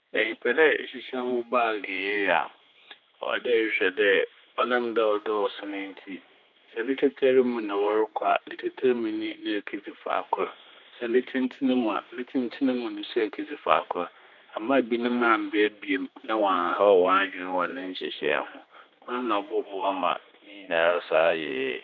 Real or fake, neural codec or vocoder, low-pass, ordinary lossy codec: fake; codec, 16 kHz, 2 kbps, X-Codec, HuBERT features, trained on general audio; 7.2 kHz; none